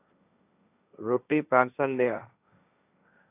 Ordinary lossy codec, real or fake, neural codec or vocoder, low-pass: AAC, 24 kbps; fake; codec, 16 kHz, 1.1 kbps, Voila-Tokenizer; 3.6 kHz